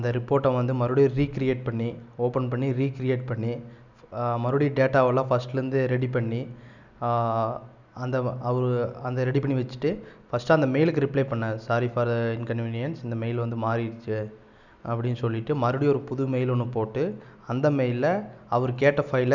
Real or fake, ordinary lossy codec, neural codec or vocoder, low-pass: real; none; none; 7.2 kHz